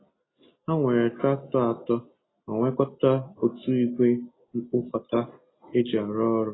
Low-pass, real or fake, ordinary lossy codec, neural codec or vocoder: 7.2 kHz; real; AAC, 16 kbps; none